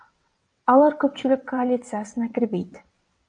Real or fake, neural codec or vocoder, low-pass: fake; vocoder, 22.05 kHz, 80 mel bands, WaveNeXt; 9.9 kHz